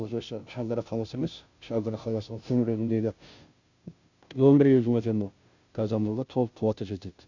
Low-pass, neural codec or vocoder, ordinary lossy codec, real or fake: 7.2 kHz; codec, 16 kHz, 0.5 kbps, FunCodec, trained on Chinese and English, 25 frames a second; Opus, 64 kbps; fake